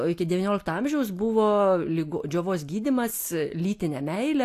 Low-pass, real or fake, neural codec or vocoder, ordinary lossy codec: 14.4 kHz; real; none; AAC, 64 kbps